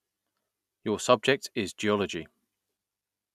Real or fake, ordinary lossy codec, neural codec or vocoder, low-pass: real; none; none; 14.4 kHz